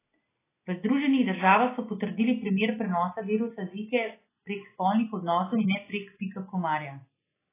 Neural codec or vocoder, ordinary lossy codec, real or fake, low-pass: none; AAC, 16 kbps; real; 3.6 kHz